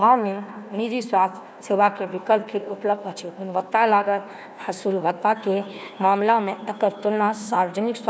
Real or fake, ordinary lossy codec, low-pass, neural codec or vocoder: fake; none; none; codec, 16 kHz, 1 kbps, FunCodec, trained on Chinese and English, 50 frames a second